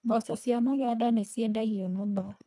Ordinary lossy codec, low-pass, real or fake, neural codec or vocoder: none; none; fake; codec, 24 kHz, 1.5 kbps, HILCodec